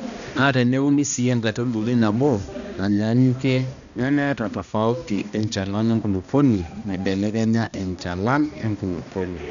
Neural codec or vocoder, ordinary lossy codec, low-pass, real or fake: codec, 16 kHz, 1 kbps, X-Codec, HuBERT features, trained on balanced general audio; none; 7.2 kHz; fake